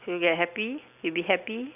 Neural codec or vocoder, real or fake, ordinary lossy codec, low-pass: none; real; none; 3.6 kHz